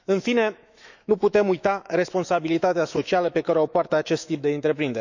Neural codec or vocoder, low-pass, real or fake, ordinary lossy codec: autoencoder, 48 kHz, 128 numbers a frame, DAC-VAE, trained on Japanese speech; 7.2 kHz; fake; none